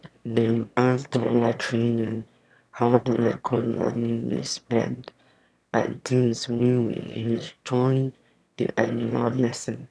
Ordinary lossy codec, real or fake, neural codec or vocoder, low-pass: none; fake; autoencoder, 22.05 kHz, a latent of 192 numbers a frame, VITS, trained on one speaker; none